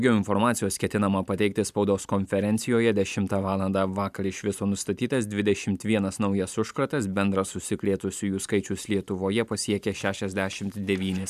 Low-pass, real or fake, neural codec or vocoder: 14.4 kHz; fake; vocoder, 44.1 kHz, 128 mel bands every 512 samples, BigVGAN v2